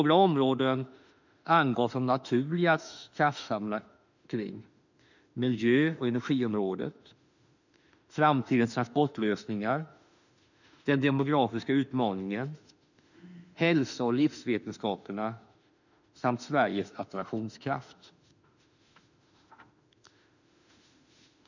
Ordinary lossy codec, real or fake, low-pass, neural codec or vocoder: none; fake; 7.2 kHz; autoencoder, 48 kHz, 32 numbers a frame, DAC-VAE, trained on Japanese speech